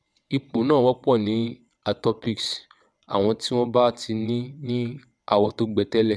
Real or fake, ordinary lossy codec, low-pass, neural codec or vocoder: fake; none; none; vocoder, 22.05 kHz, 80 mel bands, WaveNeXt